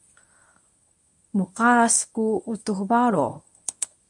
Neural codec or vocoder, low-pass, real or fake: codec, 24 kHz, 0.9 kbps, WavTokenizer, medium speech release version 1; 10.8 kHz; fake